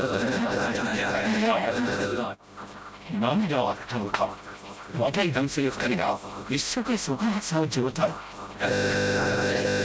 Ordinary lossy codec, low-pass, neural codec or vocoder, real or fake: none; none; codec, 16 kHz, 0.5 kbps, FreqCodec, smaller model; fake